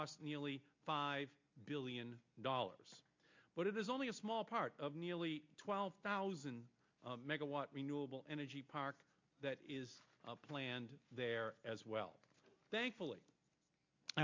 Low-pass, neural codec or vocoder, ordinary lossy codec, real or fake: 7.2 kHz; none; MP3, 48 kbps; real